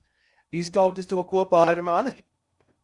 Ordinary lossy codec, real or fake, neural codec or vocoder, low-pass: Opus, 64 kbps; fake; codec, 16 kHz in and 24 kHz out, 0.8 kbps, FocalCodec, streaming, 65536 codes; 10.8 kHz